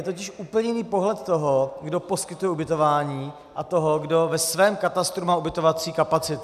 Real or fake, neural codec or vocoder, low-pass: real; none; 14.4 kHz